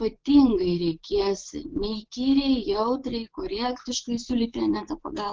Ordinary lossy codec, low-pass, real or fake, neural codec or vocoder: Opus, 16 kbps; 7.2 kHz; fake; vocoder, 24 kHz, 100 mel bands, Vocos